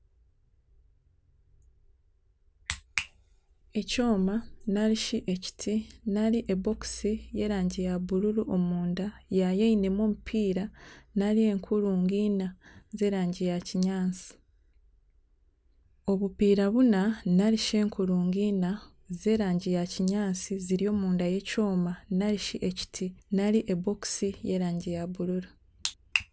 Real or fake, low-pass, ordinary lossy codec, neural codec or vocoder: real; none; none; none